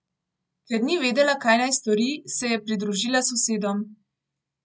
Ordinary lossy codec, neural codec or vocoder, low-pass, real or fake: none; none; none; real